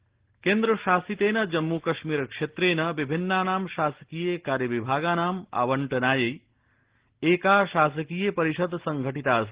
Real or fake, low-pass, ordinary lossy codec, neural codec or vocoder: real; 3.6 kHz; Opus, 16 kbps; none